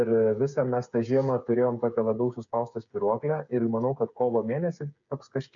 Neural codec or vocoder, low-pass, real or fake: codec, 16 kHz, 8 kbps, FreqCodec, smaller model; 7.2 kHz; fake